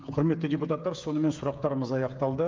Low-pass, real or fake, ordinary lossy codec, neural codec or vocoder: 7.2 kHz; fake; Opus, 24 kbps; codec, 16 kHz, 8 kbps, FreqCodec, smaller model